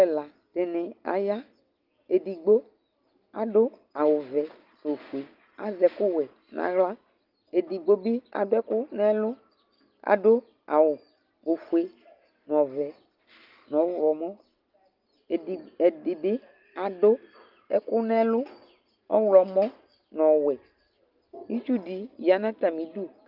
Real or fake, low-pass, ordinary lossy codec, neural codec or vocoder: real; 5.4 kHz; Opus, 24 kbps; none